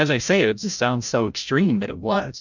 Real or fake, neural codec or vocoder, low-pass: fake; codec, 16 kHz, 0.5 kbps, FreqCodec, larger model; 7.2 kHz